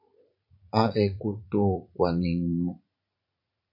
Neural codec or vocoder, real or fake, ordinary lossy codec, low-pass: vocoder, 44.1 kHz, 80 mel bands, Vocos; fake; AAC, 32 kbps; 5.4 kHz